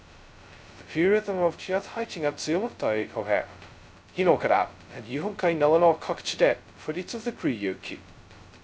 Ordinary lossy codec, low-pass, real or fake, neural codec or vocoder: none; none; fake; codec, 16 kHz, 0.2 kbps, FocalCodec